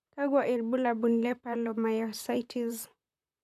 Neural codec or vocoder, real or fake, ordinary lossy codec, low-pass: vocoder, 44.1 kHz, 128 mel bands, Pupu-Vocoder; fake; none; 14.4 kHz